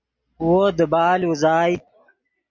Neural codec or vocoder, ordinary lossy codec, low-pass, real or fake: none; MP3, 48 kbps; 7.2 kHz; real